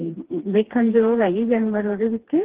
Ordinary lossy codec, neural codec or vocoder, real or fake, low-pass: Opus, 16 kbps; codec, 16 kHz, 2 kbps, FreqCodec, smaller model; fake; 3.6 kHz